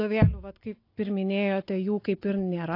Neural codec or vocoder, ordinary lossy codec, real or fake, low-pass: none; AAC, 32 kbps; real; 5.4 kHz